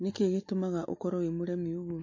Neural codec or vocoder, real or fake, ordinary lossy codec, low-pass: none; real; MP3, 32 kbps; 7.2 kHz